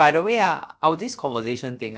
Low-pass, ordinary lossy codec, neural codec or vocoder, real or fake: none; none; codec, 16 kHz, about 1 kbps, DyCAST, with the encoder's durations; fake